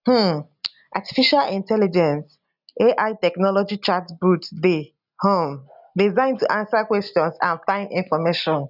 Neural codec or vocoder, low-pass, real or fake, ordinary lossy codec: none; 5.4 kHz; real; none